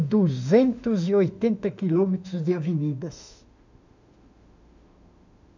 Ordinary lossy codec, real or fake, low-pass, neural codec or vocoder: none; fake; 7.2 kHz; autoencoder, 48 kHz, 32 numbers a frame, DAC-VAE, trained on Japanese speech